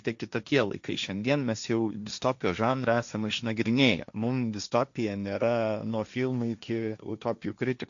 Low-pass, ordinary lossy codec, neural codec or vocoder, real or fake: 7.2 kHz; MP3, 64 kbps; codec, 16 kHz, 1.1 kbps, Voila-Tokenizer; fake